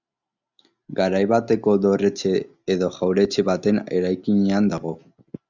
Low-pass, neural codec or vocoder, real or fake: 7.2 kHz; none; real